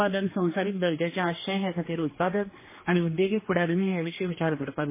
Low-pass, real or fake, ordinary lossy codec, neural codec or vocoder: 3.6 kHz; fake; MP3, 16 kbps; codec, 16 kHz, 2 kbps, X-Codec, HuBERT features, trained on general audio